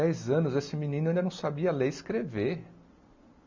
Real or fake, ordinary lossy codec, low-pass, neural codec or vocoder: real; MP3, 64 kbps; 7.2 kHz; none